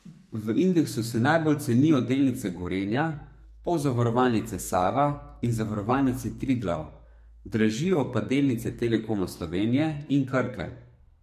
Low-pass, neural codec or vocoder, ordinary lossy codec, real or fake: 14.4 kHz; codec, 44.1 kHz, 2.6 kbps, SNAC; MP3, 64 kbps; fake